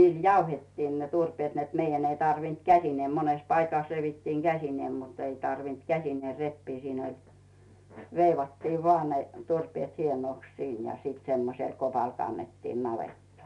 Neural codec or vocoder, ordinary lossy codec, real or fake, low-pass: none; none; real; 10.8 kHz